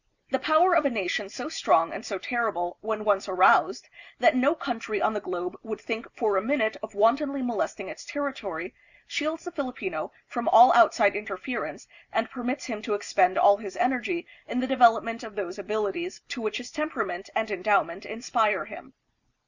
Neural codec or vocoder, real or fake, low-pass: none; real; 7.2 kHz